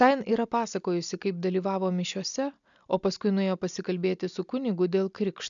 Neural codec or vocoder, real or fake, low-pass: none; real; 7.2 kHz